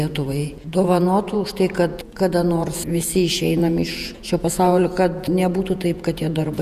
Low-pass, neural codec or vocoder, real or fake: 14.4 kHz; vocoder, 48 kHz, 128 mel bands, Vocos; fake